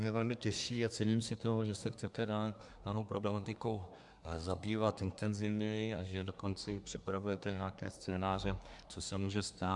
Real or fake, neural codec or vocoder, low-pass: fake; codec, 24 kHz, 1 kbps, SNAC; 10.8 kHz